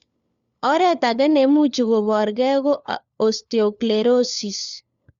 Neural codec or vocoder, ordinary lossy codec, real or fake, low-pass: codec, 16 kHz, 4 kbps, FunCodec, trained on LibriTTS, 50 frames a second; Opus, 64 kbps; fake; 7.2 kHz